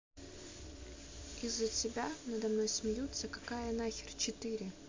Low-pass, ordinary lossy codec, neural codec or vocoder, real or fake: 7.2 kHz; MP3, 48 kbps; none; real